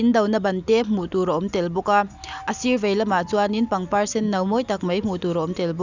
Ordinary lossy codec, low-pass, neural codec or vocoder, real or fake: none; 7.2 kHz; none; real